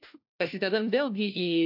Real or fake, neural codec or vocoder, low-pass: fake; codec, 16 kHz, 1 kbps, FunCodec, trained on LibriTTS, 50 frames a second; 5.4 kHz